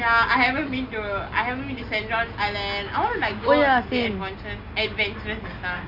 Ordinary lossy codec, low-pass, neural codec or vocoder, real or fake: none; 5.4 kHz; none; real